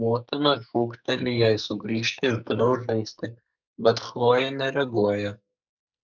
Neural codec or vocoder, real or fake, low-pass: codec, 44.1 kHz, 2.6 kbps, SNAC; fake; 7.2 kHz